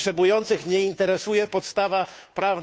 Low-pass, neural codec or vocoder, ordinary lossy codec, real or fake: none; codec, 16 kHz, 2 kbps, FunCodec, trained on Chinese and English, 25 frames a second; none; fake